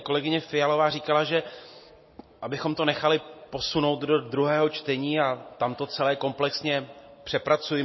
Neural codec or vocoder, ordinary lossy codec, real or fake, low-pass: none; MP3, 24 kbps; real; 7.2 kHz